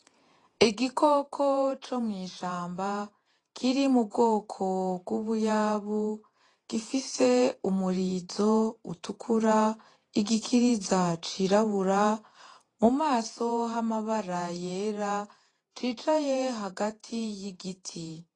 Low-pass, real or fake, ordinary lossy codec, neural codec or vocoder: 10.8 kHz; fake; AAC, 32 kbps; vocoder, 48 kHz, 128 mel bands, Vocos